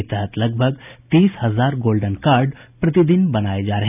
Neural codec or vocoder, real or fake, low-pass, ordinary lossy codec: none; real; 3.6 kHz; none